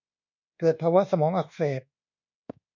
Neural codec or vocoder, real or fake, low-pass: codec, 24 kHz, 1.2 kbps, DualCodec; fake; 7.2 kHz